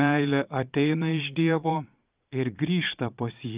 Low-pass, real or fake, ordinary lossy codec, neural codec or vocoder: 3.6 kHz; fake; Opus, 32 kbps; vocoder, 22.05 kHz, 80 mel bands, Vocos